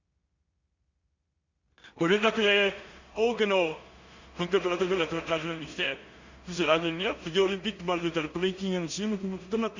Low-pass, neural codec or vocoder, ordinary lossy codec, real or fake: 7.2 kHz; codec, 16 kHz in and 24 kHz out, 0.4 kbps, LongCat-Audio-Codec, two codebook decoder; Opus, 64 kbps; fake